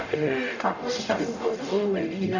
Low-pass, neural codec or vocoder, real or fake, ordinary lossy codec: 7.2 kHz; codec, 44.1 kHz, 0.9 kbps, DAC; fake; none